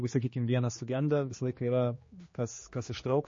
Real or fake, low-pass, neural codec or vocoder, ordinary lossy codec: fake; 7.2 kHz; codec, 16 kHz, 2 kbps, X-Codec, HuBERT features, trained on general audio; MP3, 32 kbps